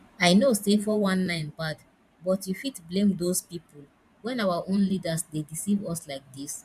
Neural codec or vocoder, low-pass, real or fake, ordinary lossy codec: vocoder, 44.1 kHz, 128 mel bands every 512 samples, BigVGAN v2; 14.4 kHz; fake; none